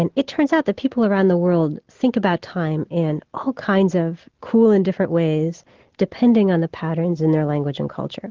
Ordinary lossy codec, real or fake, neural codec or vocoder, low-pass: Opus, 16 kbps; real; none; 7.2 kHz